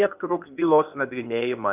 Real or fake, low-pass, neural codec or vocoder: fake; 3.6 kHz; codec, 16 kHz, 0.8 kbps, ZipCodec